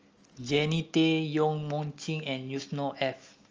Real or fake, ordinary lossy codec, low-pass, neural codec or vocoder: real; Opus, 24 kbps; 7.2 kHz; none